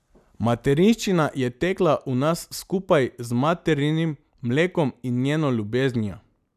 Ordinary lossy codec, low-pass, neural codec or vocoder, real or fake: none; 14.4 kHz; none; real